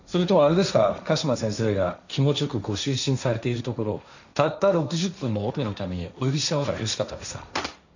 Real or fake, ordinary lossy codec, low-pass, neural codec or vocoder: fake; none; 7.2 kHz; codec, 16 kHz, 1.1 kbps, Voila-Tokenizer